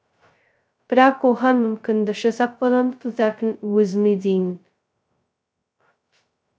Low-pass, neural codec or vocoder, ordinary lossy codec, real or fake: none; codec, 16 kHz, 0.2 kbps, FocalCodec; none; fake